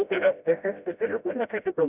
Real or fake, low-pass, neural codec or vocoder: fake; 3.6 kHz; codec, 16 kHz, 0.5 kbps, FreqCodec, smaller model